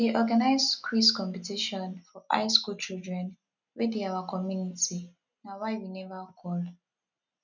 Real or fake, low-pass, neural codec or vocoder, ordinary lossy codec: real; 7.2 kHz; none; none